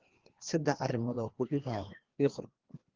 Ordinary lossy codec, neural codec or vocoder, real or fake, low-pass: Opus, 16 kbps; codec, 16 kHz, 2 kbps, FreqCodec, larger model; fake; 7.2 kHz